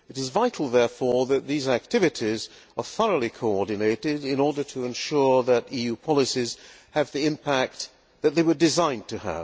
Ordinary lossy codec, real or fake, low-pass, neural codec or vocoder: none; real; none; none